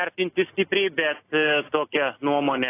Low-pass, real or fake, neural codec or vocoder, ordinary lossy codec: 3.6 kHz; real; none; AAC, 24 kbps